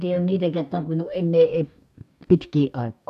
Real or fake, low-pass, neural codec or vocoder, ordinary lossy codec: fake; 14.4 kHz; codec, 44.1 kHz, 2.6 kbps, DAC; none